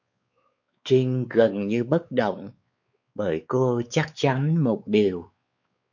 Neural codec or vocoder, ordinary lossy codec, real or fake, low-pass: codec, 16 kHz, 4 kbps, X-Codec, WavLM features, trained on Multilingual LibriSpeech; MP3, 48 kbps; fake; 7.2 kHz